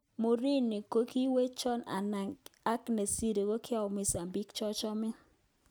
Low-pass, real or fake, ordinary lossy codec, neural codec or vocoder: none; real; none; none